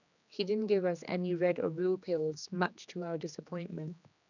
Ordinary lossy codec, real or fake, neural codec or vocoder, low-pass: none; fake; codec, 16 kHz, 2 kbps, X-Codec, HuBERT features, trained on general audio; 7.2 kHz